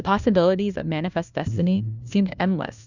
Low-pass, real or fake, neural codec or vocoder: 7.2 kHz; fake; codec, 16 kHz, 1 kbps, FunCodec, trained on LibriTTS, 50 frames a second